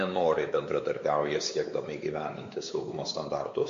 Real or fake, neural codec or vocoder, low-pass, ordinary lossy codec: fake; codec, 16 kHz, 4 kbps, X-Codec, WavLM features, trained on Multilingual LibriSpeech; 7.2 kHz; MP3, 48 kbps